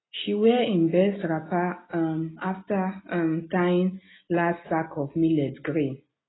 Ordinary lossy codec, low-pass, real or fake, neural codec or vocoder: AAC, 16 kbps; 7.2 kHz; real; none